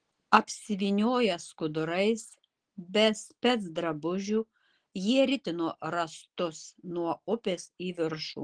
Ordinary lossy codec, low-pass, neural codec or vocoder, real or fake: Opus, 16 kbps; 9.9 kHz; none; real